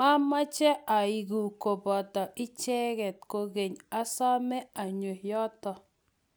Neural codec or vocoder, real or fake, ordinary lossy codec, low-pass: none; real; none; none